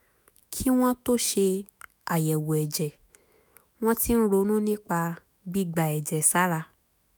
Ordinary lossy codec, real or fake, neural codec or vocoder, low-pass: none; fake; autoencoder, 48 kHz, 128 numbers a frame, DAC-VAE, trained on Japanese speech; none